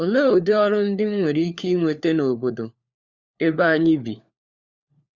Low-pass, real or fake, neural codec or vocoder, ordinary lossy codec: 7.2 kHz; fake; codec, 16 kHz, 4 kbps, FunCodec, trained on LibriTTS, 50 frames a second; Opus, 64 kbps